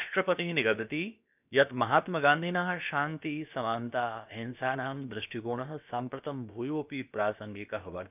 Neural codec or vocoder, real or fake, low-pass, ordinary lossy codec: codec, 16 kHz, about 1 kbps, DyCAST, with the encoder's durations; fake; 3.6 kHz; none